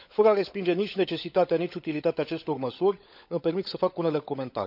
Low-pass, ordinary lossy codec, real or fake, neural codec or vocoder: 5.4 kHz; none; fake; codec, 16 kHz, 16 kbps, FunCodec, trained on LibriTTS, 50 frames a second